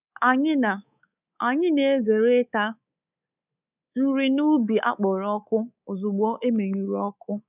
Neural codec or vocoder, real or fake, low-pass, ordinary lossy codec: codec, 24 kHz, 3.1 kbps, DualCodec; fake; 3.6 kHz; none